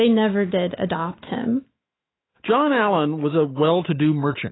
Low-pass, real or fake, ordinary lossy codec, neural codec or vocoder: 7.2 kHz; fake; AAC, 16 kbps; autoencoder, 48 kHz, 128 numbers a frame, DAC-VAE, trained on Japanese speech